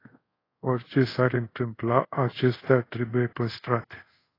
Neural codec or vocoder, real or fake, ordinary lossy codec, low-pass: codec, 24 kHz, 0.5 kbps, DualCodec; fake; AAC, 24 kbps; 5.4 kHz